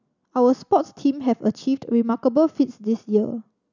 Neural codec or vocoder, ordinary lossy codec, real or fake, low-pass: none; none; real; 7.2 kHz